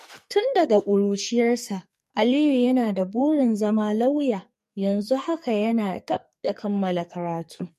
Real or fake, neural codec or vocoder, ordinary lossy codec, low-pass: fake; codec, 44.1 kHz, 2.6 kbps, SNAC; MP3, 64 kbps; 14.4 kHz